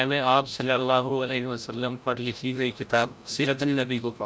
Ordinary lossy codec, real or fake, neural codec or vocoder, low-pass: none; fake; codec, 16 kHz, 0.5 kbps, FreqCodec, larger model; none